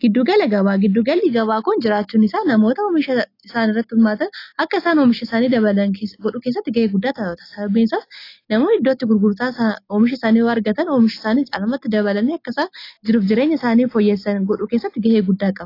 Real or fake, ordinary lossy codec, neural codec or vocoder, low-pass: real; AAC, 32 kbps; none; 5.4 kHz